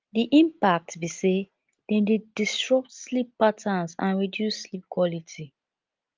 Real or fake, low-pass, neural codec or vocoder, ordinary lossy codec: real; 7.2 kHz; none; Opus, 32 kbps